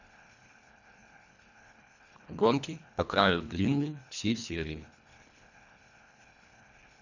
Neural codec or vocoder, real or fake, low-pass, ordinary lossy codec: codec, 24 kHz, 1.5 kbps, HILCodec; fake; 7.2 kHz; none